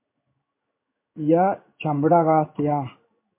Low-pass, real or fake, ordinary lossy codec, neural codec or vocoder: 3.6 kHz; fake; MP3, 32 kbps; codec, 16 kHz in and 24 kHz out, 1 kbps, XY-Tokenizer